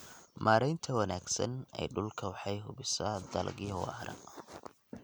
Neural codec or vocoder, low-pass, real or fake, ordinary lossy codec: none; none; real; none